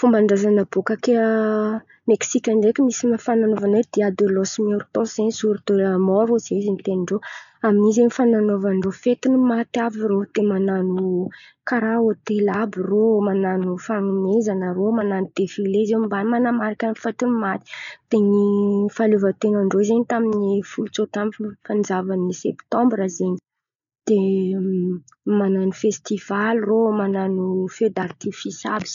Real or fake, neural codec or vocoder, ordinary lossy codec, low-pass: real; none; none; 7.2 kHz